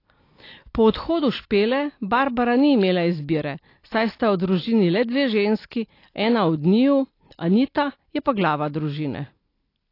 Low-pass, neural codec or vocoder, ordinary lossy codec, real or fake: 5.4 kHz; none; AAC, 32 kbps; real